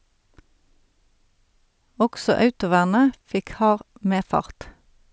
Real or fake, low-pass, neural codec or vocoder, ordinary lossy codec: real; none; none; none